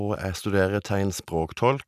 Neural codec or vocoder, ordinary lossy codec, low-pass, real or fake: none; none; 14.4 kHz; real